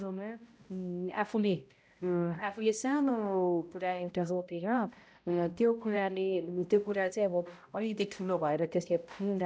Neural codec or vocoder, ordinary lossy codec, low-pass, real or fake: codec, 16 kHz, 0.5 kbps, X-Codec, HuBERT features, trained on balanced general audio; none; none; fake